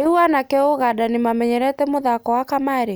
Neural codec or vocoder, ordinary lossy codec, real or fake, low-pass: none; none; real; none